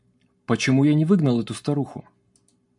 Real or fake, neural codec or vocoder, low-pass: real; none; 10.8 kHz